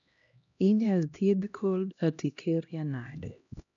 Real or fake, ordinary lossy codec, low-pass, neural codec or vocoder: fake; none; 7.2 kHz; codec, 16 kHz, 1 kbps, X-Codec, HuBERT features, trained on LibriSpeech